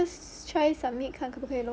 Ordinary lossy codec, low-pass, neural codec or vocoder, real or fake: none; none; none; real